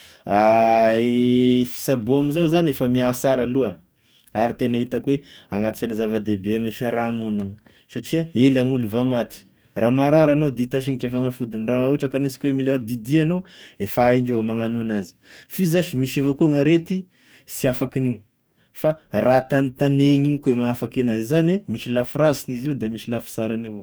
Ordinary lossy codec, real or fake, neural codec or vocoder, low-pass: none; fake; codec, 44.1 kHz, 2.6 kbps, DAC; none